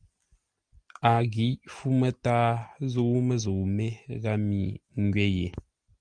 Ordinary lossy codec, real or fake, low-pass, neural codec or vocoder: Opus, 32 kbps; real; 9.9 kHz; none